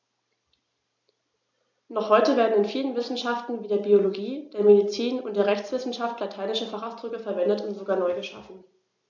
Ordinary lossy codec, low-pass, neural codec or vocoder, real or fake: none; 7.2 kHz; none; real